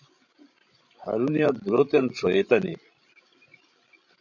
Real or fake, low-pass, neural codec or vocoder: fake; 7.2 kHz; codec, 16 kHz, 16 kbps, FreqCodec, larger model